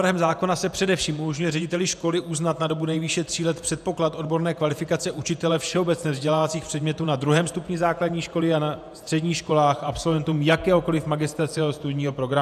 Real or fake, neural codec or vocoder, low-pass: fake; vocoder, 48 kHz, 128 mel bands, Vocos; 14.4 kHz